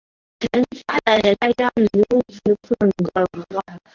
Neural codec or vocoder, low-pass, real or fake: codec, 24 kHz, 0.9 kbps, WavTokenizer, medium speech release version 1; 7.2 kHz; fake